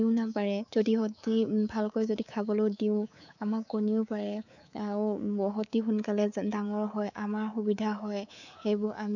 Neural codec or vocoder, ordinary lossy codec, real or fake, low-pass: codec, 24 kHz, 3.1 kbps, DualCodec; none; fake; 7.2 kHz